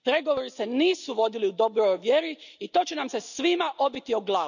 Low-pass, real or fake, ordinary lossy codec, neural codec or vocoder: 7.2 kHz; real; none; none